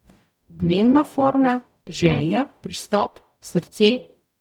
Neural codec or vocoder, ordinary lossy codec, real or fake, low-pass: codec, 44.1 kHz, 0.9 kbps, DAC; none; fake; 19.8 kHz